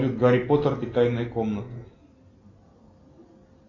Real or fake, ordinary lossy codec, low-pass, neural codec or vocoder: real; AAC, 32 kbps; 7.2 kHz; none